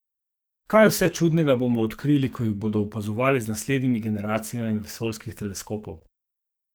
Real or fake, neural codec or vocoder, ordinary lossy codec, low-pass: fake; codec, 44.1 kHz, 2.6 kbps, SNAC; none; none